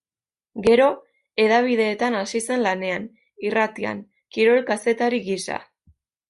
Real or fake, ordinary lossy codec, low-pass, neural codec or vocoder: real; Opus, 64 kbps; 10.8 kHz; none